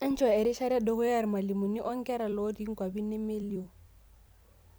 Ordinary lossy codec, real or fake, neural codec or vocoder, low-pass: none; fake; vocoder, 44.1 kHz, 128 mel bands every 256 samples, BigVGAN v2; none